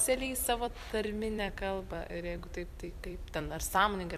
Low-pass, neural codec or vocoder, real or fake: 14.4 kHz; vocoder, 44.1 kHz, 128 mel bands every 256 samples, BigVGAN v2; fake